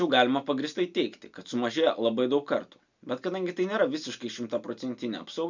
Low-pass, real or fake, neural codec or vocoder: 7.2 kHz; real; none